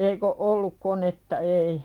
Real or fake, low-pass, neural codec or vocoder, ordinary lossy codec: fake; 19.8 kHz; vocoder, 44.1 kHz, 128 mel bands every 512 samples, BigVGAN v2; Opus, 24 kbps